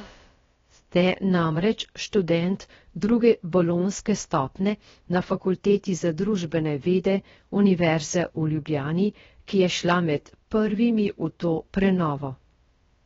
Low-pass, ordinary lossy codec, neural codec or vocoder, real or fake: 7.2 kHz; AAC, 24 kbps; codec, 16 kHz, about 1 kbps, DyCAST, with the encoder's durations; fake